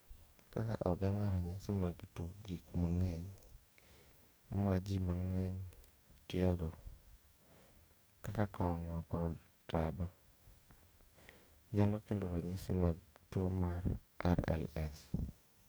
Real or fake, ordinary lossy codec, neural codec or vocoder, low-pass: fake; none; codec, 44.1 kHz, 2.6 kbps, DAC; none